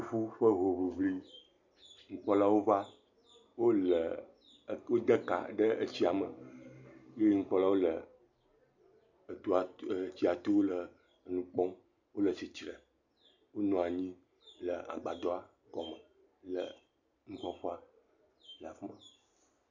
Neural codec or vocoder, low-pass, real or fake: none; 7.2 kHz; real